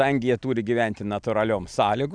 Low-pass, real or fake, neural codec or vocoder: 9.9 kHz; real; none